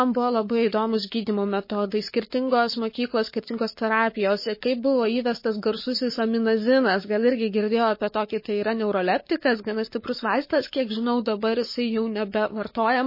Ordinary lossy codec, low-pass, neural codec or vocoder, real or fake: MP3, 24 kbps; 5.4 kHz; codec, 44.1 kHz, 7.8 kbps, Pupu-Codec; fake